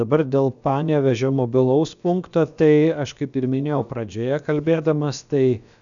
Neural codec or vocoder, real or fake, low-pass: codec, 16 kHz, about 1 kbps, DyCAST, with the encoder's durations; fake; 7.2 kHz